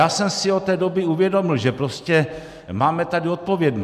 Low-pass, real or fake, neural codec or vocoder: 14.4 kHz; real; none